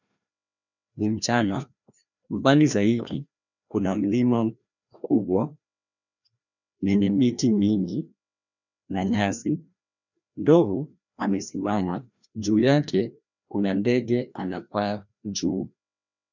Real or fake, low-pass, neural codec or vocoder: fake; 7.2 kHz; codec, 16 kHz, 1 kbps, FreqCodec, larger model